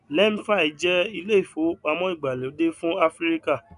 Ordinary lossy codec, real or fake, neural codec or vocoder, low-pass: none; real; none; 10.8 kHz